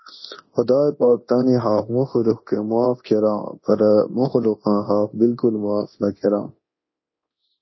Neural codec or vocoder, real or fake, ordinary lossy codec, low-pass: codec, 24 kHz, 0.9 kbps, DualCodec; fake; MP3, 24 kbps; 7.2 kHz